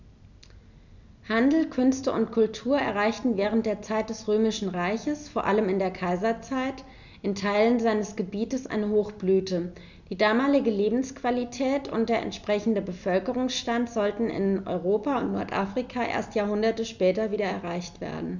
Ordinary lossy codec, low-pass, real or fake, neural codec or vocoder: none; 7.2 kHz; real; none